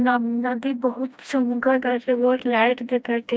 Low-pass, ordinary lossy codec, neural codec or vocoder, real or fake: none; none; codec, 16 kHz, 1 kbps, FreqCodec, smaller model; fake